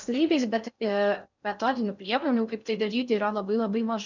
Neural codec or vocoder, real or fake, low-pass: codec, 16 kHz in and 24 kHz out, 0.6 kbps, FocalCodec, streaming, 4096 codes; fake; 7.2 kHz